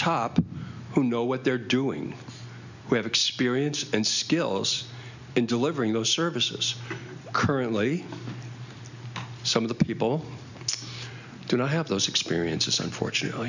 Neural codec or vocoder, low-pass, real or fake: none; 7.2 kHz; real